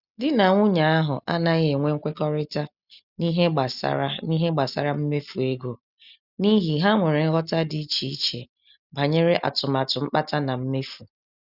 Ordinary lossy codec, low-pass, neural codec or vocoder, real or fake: none; 5.4 kHz; none; real